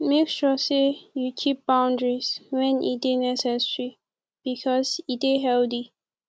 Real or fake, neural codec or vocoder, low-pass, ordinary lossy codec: real; none; none; none